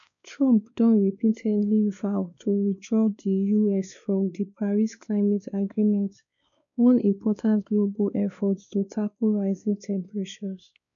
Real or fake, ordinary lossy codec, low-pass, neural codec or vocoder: fake; none; 7.2 kHz; codec, 16 kHz, 2 kbps, X-Codec, WavLM features, trained on Multilingual LibriSpeech